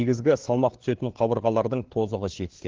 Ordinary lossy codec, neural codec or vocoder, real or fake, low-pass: Opus, 16 kbps; codec, 16 kHz, 4 kbps, FunCodec, trained on LibriTTS, 50 frames a second; fake; 7.2 kHz